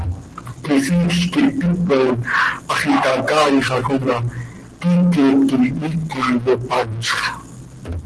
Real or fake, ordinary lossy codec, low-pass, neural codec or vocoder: real; Opus, 16 kbps; 10.8 kHz; none